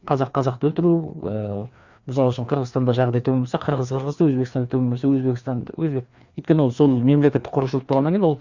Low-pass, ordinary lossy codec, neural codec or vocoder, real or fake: 7.2 kHz; Opus, 64 kbps; codec, 16 kHz, 2 kbps, FreqCodec, larger model; fake